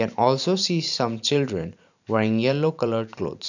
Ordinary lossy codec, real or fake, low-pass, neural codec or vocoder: none; fake; 7.2 kHz; vocoder, 44.1 kHz, 128 mel bands every 512 samples, BigVGAN v2